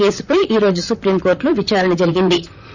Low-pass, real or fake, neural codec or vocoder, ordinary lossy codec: 7.2 kHz; fake; vocoder, 44.1 kHz, 128 mel bands, Pupu-Vocoder; none